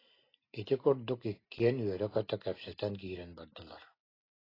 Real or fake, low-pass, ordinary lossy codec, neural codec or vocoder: real; 5.4 kHz; AAC, 24 kbps; none